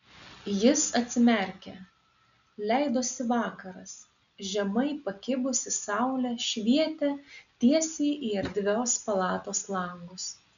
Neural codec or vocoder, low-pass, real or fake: none; 7.2 kHz; real